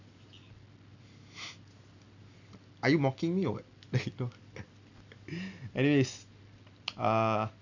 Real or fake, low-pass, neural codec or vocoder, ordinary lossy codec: real; 7.2 kHz; none; none